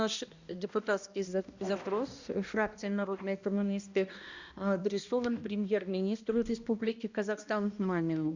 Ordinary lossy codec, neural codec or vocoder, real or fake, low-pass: Opus, 64 kbps; codec, 16 kHz, 1 kbps, X-Codec, HuBERT features, trained on balanced general audio; fake; 7.2 kHz